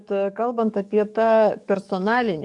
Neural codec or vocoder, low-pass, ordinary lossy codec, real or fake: codec, 44.1 kHz, 7.8 kbps, DAC; 10.8 kHz; MP3, 96 kbps; fake